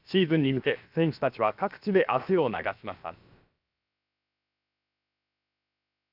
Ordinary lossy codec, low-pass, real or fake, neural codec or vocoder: none; 5.4 kHz; fake; codec, 16 kHz, about 1 kbps, DyCAST, with the encoder's durations